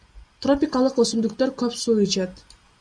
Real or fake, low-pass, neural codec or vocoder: real; 9.9 kHz; none